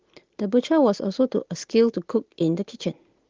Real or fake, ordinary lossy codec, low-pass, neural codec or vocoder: real; Opus, 16 kbps; 7.2 kHz; none